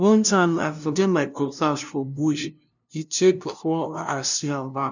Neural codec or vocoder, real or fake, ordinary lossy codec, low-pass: codec, 16 kHz, 0.5 kbps, FunCodec, trained on LibriTTS, 25 frames a second; fake; none; 7.2 kHz